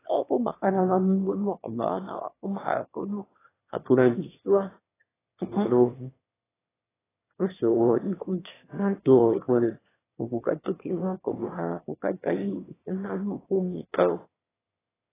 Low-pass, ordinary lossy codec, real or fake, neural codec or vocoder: 3.6 kHz; AAC, 16 kbps; fake; autoencoder, 22.05 kHz, a latent of 192 numbers a frame, VITS, trained on one speaker